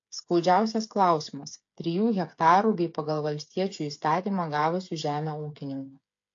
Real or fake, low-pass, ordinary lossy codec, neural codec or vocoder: fake; 7.2 kHz; AAC, 48 kbps; codec, 16 kHz, 8 kbps, FreqCodec, smaller model